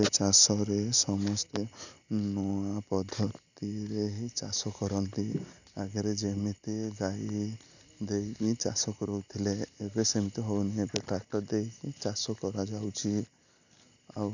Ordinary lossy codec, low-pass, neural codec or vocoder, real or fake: none; 7.2 kHz; none; real